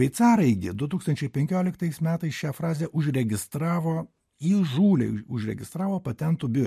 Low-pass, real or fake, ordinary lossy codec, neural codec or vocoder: 14.4 kHz; real; MP3, 64 kbps; none